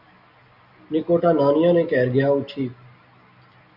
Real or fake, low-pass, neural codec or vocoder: real; 5.4 kHz; none